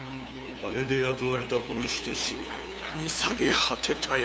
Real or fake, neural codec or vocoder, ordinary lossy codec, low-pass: fake; codec, 16 kHz, 2 kbps, FunCodec, trained on LibriTTS, 25 frames a second; none; none